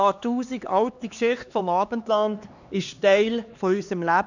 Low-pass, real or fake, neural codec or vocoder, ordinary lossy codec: 7.2 kHz; fake; codec, 16 kHz, 2 kbps, X-Codec, HuBERT features, trained on LibriSpeech; none